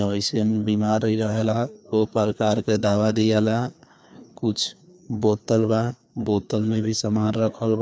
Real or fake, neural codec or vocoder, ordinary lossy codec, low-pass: fake; codec, 16 kHz, 2 kbps, FreqCodec, larger model; none; none